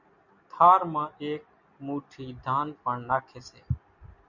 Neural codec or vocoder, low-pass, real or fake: none; 7.2 kHz; real